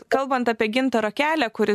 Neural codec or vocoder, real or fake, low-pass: none; real; 14.4 kHz